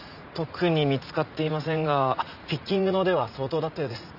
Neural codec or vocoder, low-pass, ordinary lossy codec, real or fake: vocoder, 44.1 kHz, 80 mel bands, Vocos; 5.4 kHz; none; fake